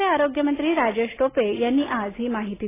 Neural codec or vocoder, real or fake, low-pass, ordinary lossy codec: none; real; 3.6 kHz; AAC, 16 kbps